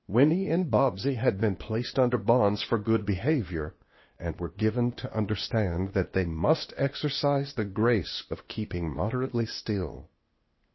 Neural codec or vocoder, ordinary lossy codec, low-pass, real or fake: codec, 16 kHz, 0.8 kbps, ZipCodec; MP3, 24 kbps; 7.2 kHz; fake